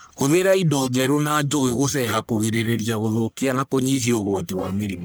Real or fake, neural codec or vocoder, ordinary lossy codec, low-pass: fake; codec, 44.1 kHz, 1.7 kbps, Pupu-Codec; none; none